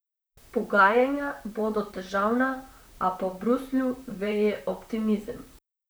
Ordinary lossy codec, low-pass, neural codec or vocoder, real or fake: none; none; vocoder, 44.1 kHz, 128 mel bands, Pupu-Vocoder; fake